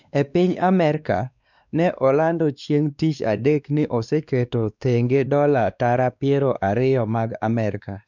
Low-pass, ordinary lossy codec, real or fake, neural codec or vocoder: 7.2 kHz; none; fake; codec, 16 kHz, 2 kbps, X-Codec, WavLM features, trained on Multilingual LibriSpeech